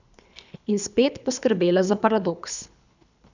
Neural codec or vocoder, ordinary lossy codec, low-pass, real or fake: codec, 24 kHz, 3 kbps, HILCodec; none; 7.2 kHz; fake